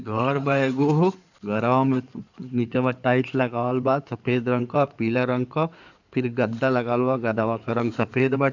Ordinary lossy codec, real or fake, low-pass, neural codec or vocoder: none; fake; 7.2 kHz; codec, 16 kHz in and 24 kHz out, 2.2 kbps, FireRedTTS-2 codec